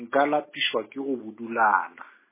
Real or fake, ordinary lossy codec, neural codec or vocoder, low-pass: real; MP3, 16 kbps; none; 3.6 kHz